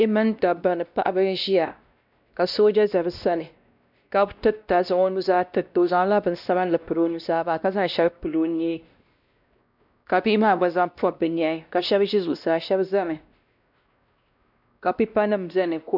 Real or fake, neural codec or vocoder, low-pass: fake; codec, 16 kHz, 1 kbps, X-Codec, WavLM features, trained on Multilingual LibriSpeech; 5.4 kHz